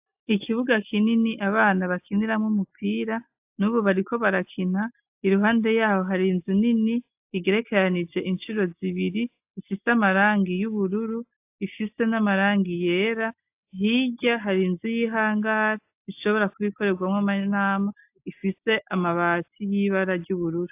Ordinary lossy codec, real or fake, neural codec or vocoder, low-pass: AAC, 32 kbps; real; none; 3.6 kHz